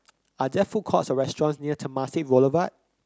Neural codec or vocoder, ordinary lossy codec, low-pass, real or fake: none; none; none; real